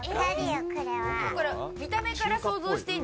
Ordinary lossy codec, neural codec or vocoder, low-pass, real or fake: none; none; none; real